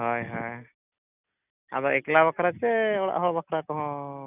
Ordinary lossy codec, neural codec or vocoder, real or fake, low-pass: none; none; real; 3.6 kHz